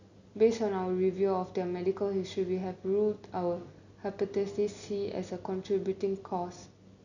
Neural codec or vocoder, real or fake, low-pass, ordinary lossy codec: none; real; 7.2 kHz; none